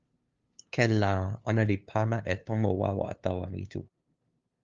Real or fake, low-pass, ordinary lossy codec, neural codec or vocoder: fake; 7.2 kHz; Opus, 32 kbps; codec, 16 kHz, 2 kbps, FunCodec, trained on LibriTTS, 25 frames a second